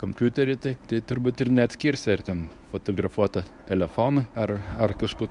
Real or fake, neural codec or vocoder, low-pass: fake; codec, 24 kHz, 0.9 kbps, WavTokenizer, medium speech release version 1; 10.8 kHz